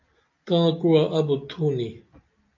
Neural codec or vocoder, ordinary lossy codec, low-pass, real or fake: none; MP3, 48 kbps; 7.2 kHz; real